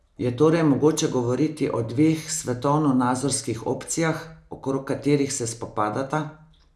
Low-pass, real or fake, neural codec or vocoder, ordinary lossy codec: none; real; none; none